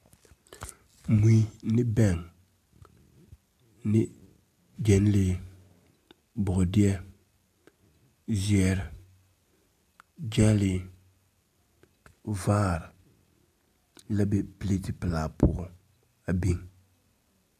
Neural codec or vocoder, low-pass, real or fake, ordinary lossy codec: none; 14.4 kHz; real; AAC, 96 kbps